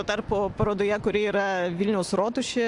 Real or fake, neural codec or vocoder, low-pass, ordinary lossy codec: real; none; 10.8 kHz; AAC, 64 kbps